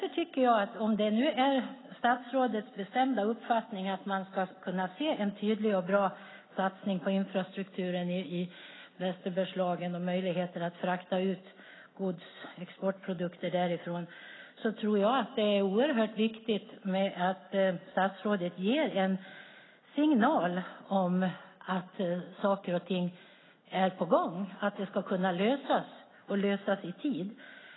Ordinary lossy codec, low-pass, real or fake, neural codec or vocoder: AAC, 16 kbps; 7.2 kHz; real; none